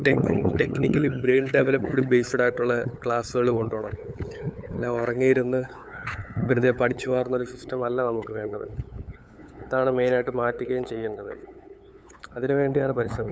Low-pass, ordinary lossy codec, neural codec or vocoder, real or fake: none; none; codec, 16 kHz, 8 kbps, FunCodec, trained on LibriTTS, 25 frames a second; fake